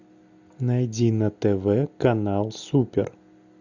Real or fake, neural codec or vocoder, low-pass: real; none; 7.2 kHz